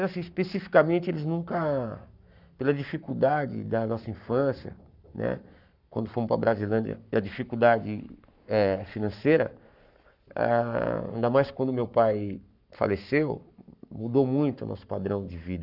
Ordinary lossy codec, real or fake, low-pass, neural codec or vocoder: none; fake; 5.4 kHz; codec, 44.1 kHz, 7.8 kbps, Pupu-Codec